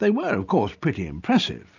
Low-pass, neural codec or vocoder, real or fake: 7.2 kHz; none; real